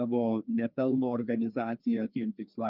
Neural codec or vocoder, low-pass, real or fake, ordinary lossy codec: codec, 16 kHz, 2 kbps, FreqCodec, larger model; 5.4 kHz; fake; Opus, 32 kbps